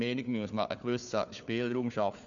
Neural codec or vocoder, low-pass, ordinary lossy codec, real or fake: codec, 16 kHz, 4 kbps, FunCodec, trained on Chinese and English, 50 frames a second; 7.2 kHz; none; fake